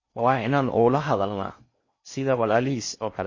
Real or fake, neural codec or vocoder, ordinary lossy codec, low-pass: fake; codec, 16 kHz in and 24 kHz out, 0.6 kbps, FocalCodec, streaming, 4096 codes; MP3, 32 kbps; 7.2 kHz